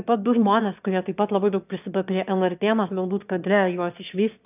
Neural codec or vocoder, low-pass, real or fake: autoencoder, 22.05 kHz, a latent of 192 numbers a frame, VITS, trained on one speaker; 3.6 kHz; fake